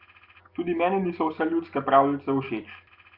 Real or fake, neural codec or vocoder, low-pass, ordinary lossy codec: real; none; 5.4 kHz; Opus, 32 kbps